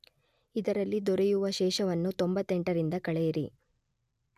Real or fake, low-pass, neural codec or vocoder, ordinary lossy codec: real; 14.4 kHz; none; none